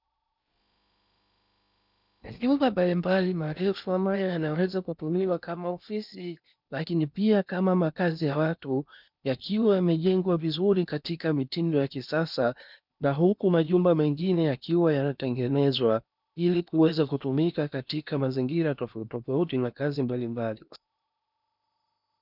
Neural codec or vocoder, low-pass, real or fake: codec, 16 kHz in and 24 kHz out, 0.8 kbps, FocalCodec, streaming, 65536 codes; 5.4 kHz; fake